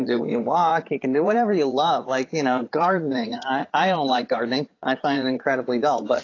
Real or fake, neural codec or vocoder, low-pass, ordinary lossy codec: fake; vocoder, 22.05 kHz, 80 mel bands, Vocos; 7.2 kHz; AAC, 32 kbps